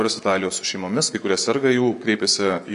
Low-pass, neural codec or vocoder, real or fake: 10.8 kHz; vocoder, 24 kHz, 100 mel bands, Vocos; fake